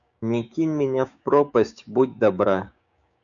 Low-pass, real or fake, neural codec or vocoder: 7.2 kHz; fake; codec, 16 kHz, 6 kbps, DAC